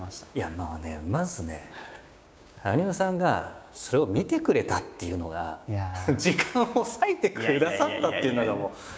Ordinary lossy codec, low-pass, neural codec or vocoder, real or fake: none; none; codec, 16 kHz, 6 kbps, DAC; fake